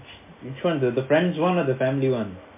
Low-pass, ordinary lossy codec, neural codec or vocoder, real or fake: 3.6 kHz; MP3, 24 kbps; none; real